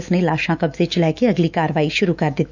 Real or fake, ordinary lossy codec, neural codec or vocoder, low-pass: fake; none; autoencoder, 48 kHz, 128 numbers a frame, DAC-VAE, trained on Japanese speech; 7.2 kHz